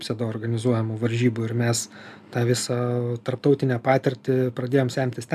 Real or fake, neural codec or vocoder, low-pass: real; none; 14.4 kHz